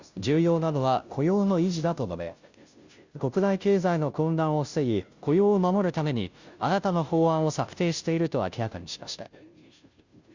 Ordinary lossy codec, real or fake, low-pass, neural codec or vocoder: Opus, 64 kbps; fake; 7.2 kHz; codec, 16 kHz, 0.5 kbps, FunCodec, trained on Chinese and English, 25 frames a second